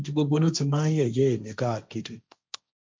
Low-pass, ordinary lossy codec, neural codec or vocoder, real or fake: none; none; codec, 16 kHz, 1.1 kbps, Voila-Tokenizer; fake